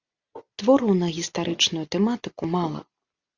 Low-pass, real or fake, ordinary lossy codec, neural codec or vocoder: 7.2 kHz; real; Opus, 64 kbps; none